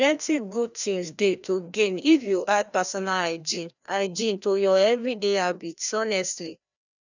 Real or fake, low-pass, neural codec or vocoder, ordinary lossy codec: fake; 7.2 kHz; codec, 16 kHz, 1 kbps, FreqCodec, larger model; none